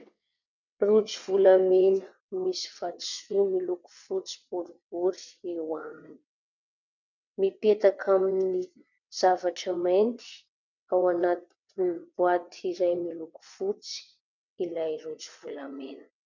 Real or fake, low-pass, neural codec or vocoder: fake; 7.2 kHz; vocoder, 22.05 kHz, 80 mel bands, WaveNeXt